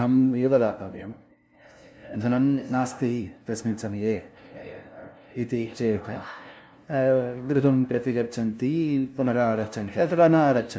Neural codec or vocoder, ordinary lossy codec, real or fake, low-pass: codec, 16 kHz, 0.5 kbps, FunCodec, trained on LibriTTS, 25 frames a second; none; fake; none